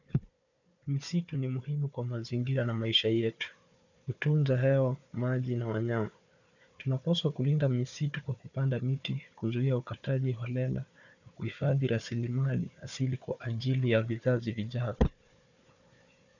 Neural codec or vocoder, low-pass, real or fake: codec, 16 kHz, 4 kbps, FunCodec, trained on Chinese and English, 50 frames a second; 7.2 kHz; fake